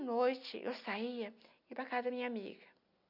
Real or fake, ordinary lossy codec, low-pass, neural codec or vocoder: real; none; 5.4 kHz; none